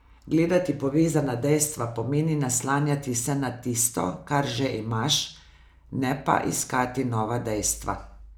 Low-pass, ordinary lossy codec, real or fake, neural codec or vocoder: none; none; real; none